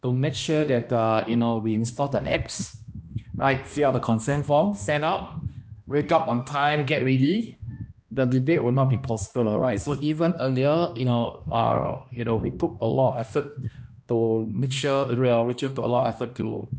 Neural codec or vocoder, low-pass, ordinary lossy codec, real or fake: codec, 16 kHz, 1 kbps, X-Codec, HuBERT features, trained on balanced general audio; none; none; fake